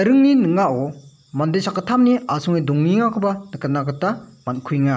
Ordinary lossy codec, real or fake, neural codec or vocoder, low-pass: none; real; none; none